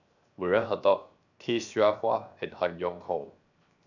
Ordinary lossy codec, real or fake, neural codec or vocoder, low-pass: none; fake; codec, 16 kHz, 0.7 kbps, FocalCodec; 7.2 kHz